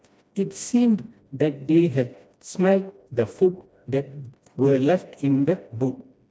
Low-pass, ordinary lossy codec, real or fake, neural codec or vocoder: none; none; fake; codec, 16 kHz, 1 kbps, FreqCodec, smaller model